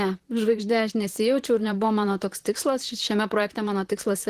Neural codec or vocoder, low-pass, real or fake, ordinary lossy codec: vocoder, 44.1 kHz, 128 mel bands, Pupu-Vocoder; 14.4 kHz; fake; Opus, 16 kbps